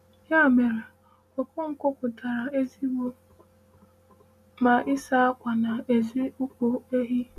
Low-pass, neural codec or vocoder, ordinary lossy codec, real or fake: 14.4 kHz; none; none; real